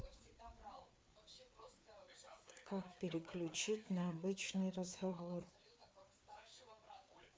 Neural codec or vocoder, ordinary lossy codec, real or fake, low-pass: codec, 16 kHz, 4 kbps, FreqCodec, larger model; none; fake; none